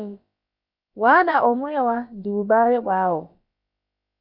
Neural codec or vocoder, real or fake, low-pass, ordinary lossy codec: codec, 16 kHz, about 1 kbps, DyCAST, with the encoder's durations; fake; 5.4 kHz; Opus, 64 kbps